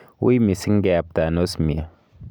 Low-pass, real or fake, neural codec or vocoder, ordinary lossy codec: none; real; none; none